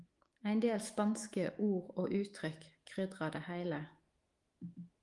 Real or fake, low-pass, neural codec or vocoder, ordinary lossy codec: fake; 10.8 kHz; autoencoder, 48 kHz, 128 numbers a frame, DAC-VAE, trained on Japanese speech; Opus, 24 kbps